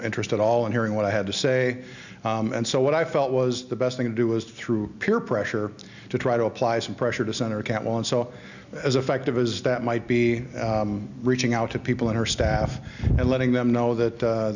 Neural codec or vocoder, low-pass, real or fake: none; 7.2 kHz; real